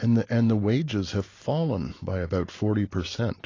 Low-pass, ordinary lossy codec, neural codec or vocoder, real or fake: 7.2 kHz; AAC, 32 kbps; none; real